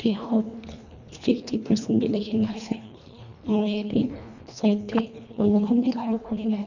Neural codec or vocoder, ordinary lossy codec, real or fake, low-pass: codec, 24 kHz, 1.5 kbps, HILCodec; none; fake; 7.2 kHz